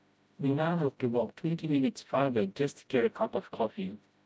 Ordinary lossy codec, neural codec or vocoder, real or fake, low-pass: none; codec, 16 kHz, 0.5 kbps, FreqCodec, smaller model; fake; none